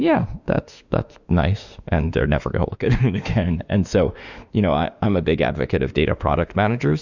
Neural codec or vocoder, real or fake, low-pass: autoencoder, 48 kHz, 32 numbers a frame, DAC-VAE, trained on Japanese speech; fake; 7.2 kHz